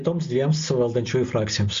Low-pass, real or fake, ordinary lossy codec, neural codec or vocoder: 7.2 kHz; real; MP3, 48 kbps; none